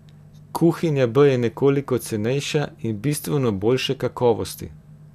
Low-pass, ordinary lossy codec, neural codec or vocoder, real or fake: 14.4 kHz; none; none; real